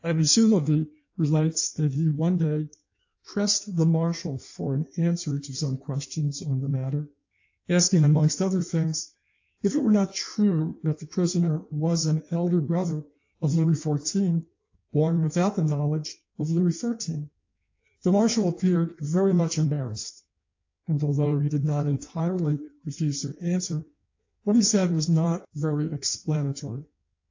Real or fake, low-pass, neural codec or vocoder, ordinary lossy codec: fake; 7.2 kHz; codec, 16 kHz in and 24 kHz out, 1.1 kbps, FireRedTTS-2 codec; AAC, 48 kbps